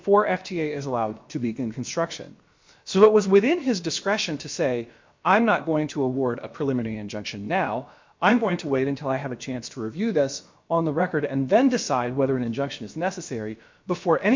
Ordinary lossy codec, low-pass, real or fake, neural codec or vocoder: MP3, 48 kbps; 7.2 kHz; fake; codec, 16 kHz, about 1 kbps, DyCAST, with the encoder's durations